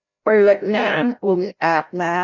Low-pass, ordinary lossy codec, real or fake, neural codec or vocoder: 7.2 kHz; none; fake; codec, 16 kHz, 0.5 kbps, FreqCodec, larger model